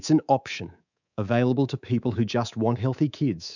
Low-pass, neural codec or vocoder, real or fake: 7.2 kHz; codec, 24 kHz, 3.1 kbps, DualCodec; fake